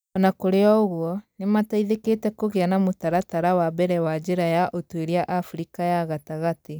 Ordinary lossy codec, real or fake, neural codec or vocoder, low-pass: none; real; none; none